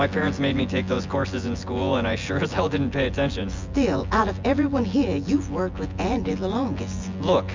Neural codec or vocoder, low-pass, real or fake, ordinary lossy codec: vocoder, 24 kHz, 100 mel bands, Vocos; 7.2 kHz; fake; MP3, 64 kbps